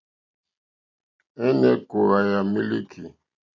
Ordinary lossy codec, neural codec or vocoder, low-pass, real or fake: AAC, 48 kbps; none; 7.2 kHz; real